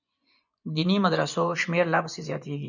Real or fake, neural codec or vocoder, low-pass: fake; vocoder, 24 kHz, 100 mel bands, Vocos; 7.2 kHz